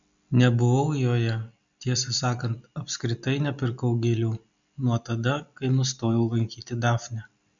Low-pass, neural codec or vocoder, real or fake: 7.2 kHz; none; real